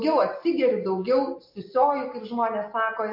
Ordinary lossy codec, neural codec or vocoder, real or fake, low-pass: MP3, 32 kbps; none; real; 5.4 kHz